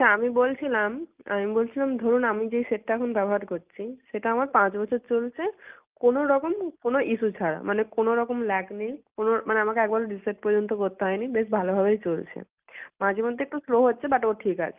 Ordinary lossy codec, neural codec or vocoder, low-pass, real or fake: Opus, 24 kbps; none; 3.6 kHz; real